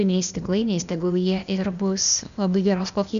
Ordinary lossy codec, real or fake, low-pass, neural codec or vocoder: MP3, 96 kbps; fake; 7.2 kHz; codec, 16 kHz, 0.8 kbps, ZipCodec